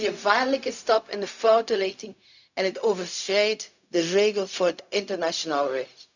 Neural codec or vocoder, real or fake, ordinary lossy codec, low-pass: codec, 16 kHz, 0.4 kbps, LongCat-Audio-Codec; fake; none; 7.2 kHz